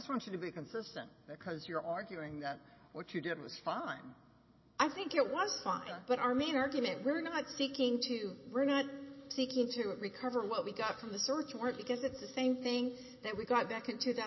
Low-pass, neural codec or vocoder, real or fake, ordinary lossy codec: 7.2 kHz; none; real; MP3, 24 kbps